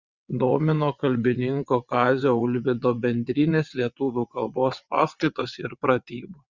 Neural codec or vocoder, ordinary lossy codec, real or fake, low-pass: vocoder, 22.05 kHz, 80 mel bands, WaveNeXt; Opus, 64 kbps; fake; 7.2 kHz